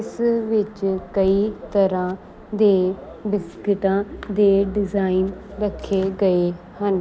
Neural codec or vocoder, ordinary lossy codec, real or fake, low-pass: none; none; real; none